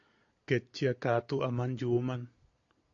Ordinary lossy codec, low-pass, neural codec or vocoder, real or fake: AAC, 48 kbps; 7.2 kHz; none; real